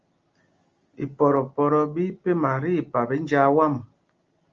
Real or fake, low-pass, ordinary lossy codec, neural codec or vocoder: real; 7.2 kHz; Opus, 32 kbps; none